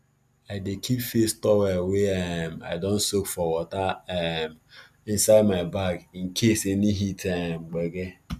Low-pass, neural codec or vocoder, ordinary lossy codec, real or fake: 14.4 kHz; none; none; real